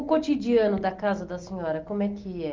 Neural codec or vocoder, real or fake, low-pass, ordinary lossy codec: none; real; 7.2 kHz; Opus, 24 kbps